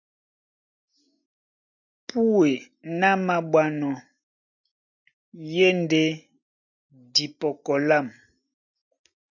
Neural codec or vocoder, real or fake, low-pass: none; real; 7.2 kHz